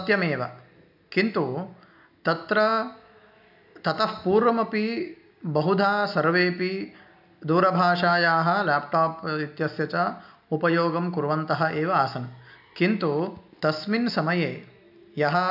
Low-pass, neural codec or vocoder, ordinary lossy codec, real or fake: 5.4 kHz; none; none; real